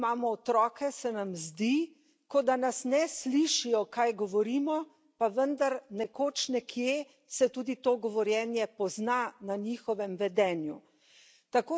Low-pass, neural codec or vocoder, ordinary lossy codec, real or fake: none; none; none; real